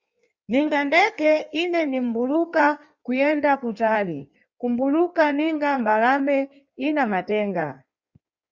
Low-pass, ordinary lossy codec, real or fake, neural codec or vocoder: 7.2 kHz; Opus, 64 kbps; fake; codec, 16 kHz in and 24 kHz out, 1.1 kbps, FireRedTTS-2 codec